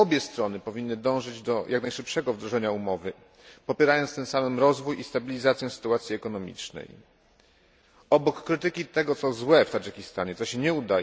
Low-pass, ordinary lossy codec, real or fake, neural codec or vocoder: none; none; real; none